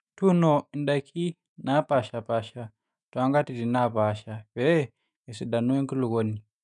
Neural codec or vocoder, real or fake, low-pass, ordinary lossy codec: autoencoder, 48 kHz, 128 numbers a frame, DAC-VAE, trained on Japanese speech; fake; 10.8 kHz; none